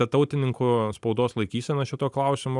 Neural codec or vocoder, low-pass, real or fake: none; 10.8 kHz; real